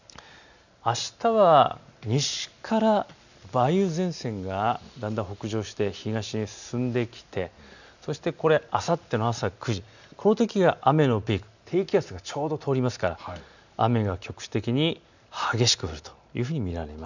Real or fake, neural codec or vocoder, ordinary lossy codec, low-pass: real; none; none; 7.2 kHz